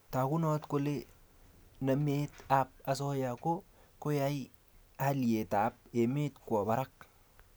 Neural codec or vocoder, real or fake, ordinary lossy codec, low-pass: none; real; none; none